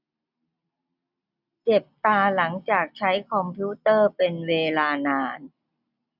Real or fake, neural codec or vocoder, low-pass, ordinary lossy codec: real; none; 5.4 kHz; none